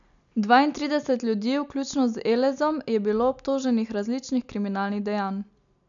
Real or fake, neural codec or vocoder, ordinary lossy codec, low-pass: real; none; none; 7.2 kHz